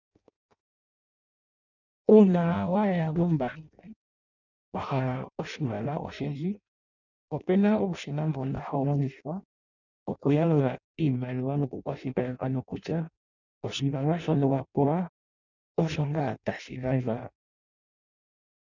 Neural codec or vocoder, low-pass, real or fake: codec, 16 kHz in and 24 kHz out, 0.6 kbps, FireRedTTS-2 codec; 7.2 kHz; fake